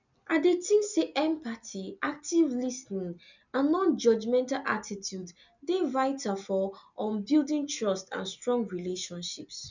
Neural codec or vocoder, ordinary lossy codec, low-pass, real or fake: none; none; 7.2 kHz; real